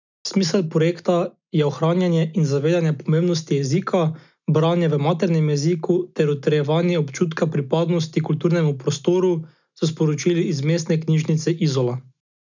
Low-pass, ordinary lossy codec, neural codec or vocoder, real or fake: 7.2 kHz; none; none; real